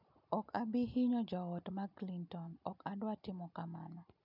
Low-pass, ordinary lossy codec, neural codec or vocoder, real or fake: 5.4 kHz; none; none; real